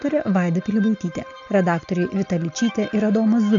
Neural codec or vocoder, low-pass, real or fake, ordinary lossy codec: none; 7.2 kHz; real; AAC, 64 kbps